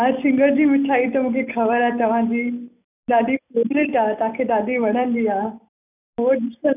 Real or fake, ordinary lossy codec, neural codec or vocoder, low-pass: real; none; none; 3.6 kHz